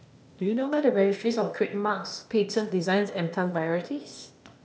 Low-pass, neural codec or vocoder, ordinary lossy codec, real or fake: none; codec, 16 kHz, 0.8 kbps, ZipCodec; none; fake